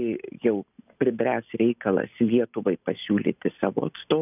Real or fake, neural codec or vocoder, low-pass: real; none; 3.6 kHz